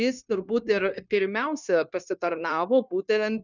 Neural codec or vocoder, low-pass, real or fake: codec, 16 kHz, 0.9 kbps, LongCat-Audio-Codec; 7.2 kHz; fake